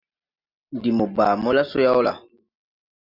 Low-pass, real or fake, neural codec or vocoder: 5.4 kHz; real; none